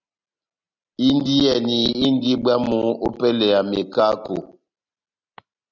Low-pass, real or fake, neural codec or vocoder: 7.2 kHz; real; none